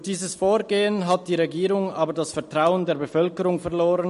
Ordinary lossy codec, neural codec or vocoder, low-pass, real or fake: MP3, 48 kbps; none; 14.4 kHz; real